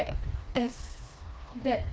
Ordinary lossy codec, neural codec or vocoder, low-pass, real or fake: none; codec, 16 kHz, 2 kbps, FreqCodec, smaller model; none; fake